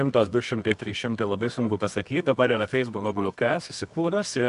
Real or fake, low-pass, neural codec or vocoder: fake; 10.8 kHz; codec, 24 kHz, 0.9 kbps, WavTokenizer, medium music audio release